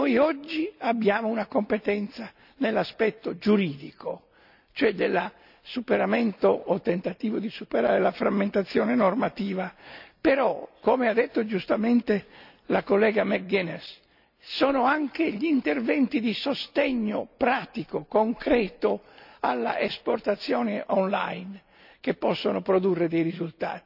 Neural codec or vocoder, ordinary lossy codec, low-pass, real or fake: none; none; 5.4 kHz; real